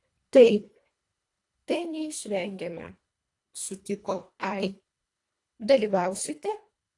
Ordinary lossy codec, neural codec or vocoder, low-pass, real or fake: AAC, 48 kbps; codec, 24 kHz, 1.5 kbps, HILCodec; 10.8 kHz; fake